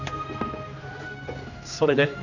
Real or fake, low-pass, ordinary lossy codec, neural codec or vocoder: fake; 7.2 kHz; none; codec, 16 kHz, 2 kbps, X-Codec, HuBERT features, trained on general audio